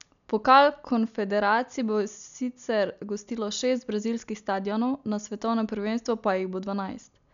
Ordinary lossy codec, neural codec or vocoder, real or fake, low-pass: none; none; real; 7.2 kHz